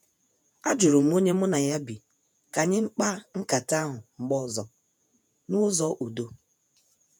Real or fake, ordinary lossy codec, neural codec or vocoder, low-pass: fake; none; vocoder, 48 kHz, 128 mel bands, Vocos; none